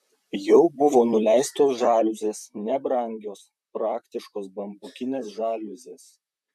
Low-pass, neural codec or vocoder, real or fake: 14.4 kHz; vocoder, 44.1 kHz, 128 mel bands, Pupu-Vocoder; fake